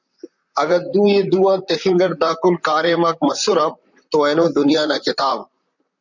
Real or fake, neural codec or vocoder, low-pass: fake; vocoder, 44.1 kHz, 128 mel bands, Pupu-Vocoder; 7.2 kHz